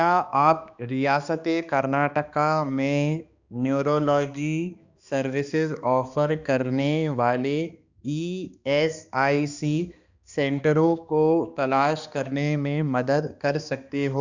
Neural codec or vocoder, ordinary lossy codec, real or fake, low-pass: codec, 16 kHz, 2 kbps, X-Codec, HuBERT features, trained on balanced general audio; Opus, 64 kbps; fake; 7.2 kHz